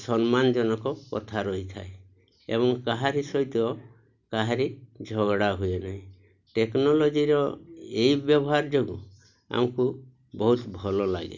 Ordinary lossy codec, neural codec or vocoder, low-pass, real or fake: none; none; 7.2 kHz; real